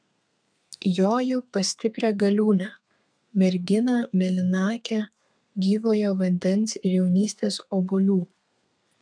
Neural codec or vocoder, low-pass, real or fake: codec, 32 kHz, 1.9 kbps, SNAC; 9.9 kHz; fake